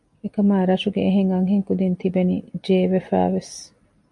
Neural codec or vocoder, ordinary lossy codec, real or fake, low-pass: none; MP3, 64 kbps; real; 10.8 kHz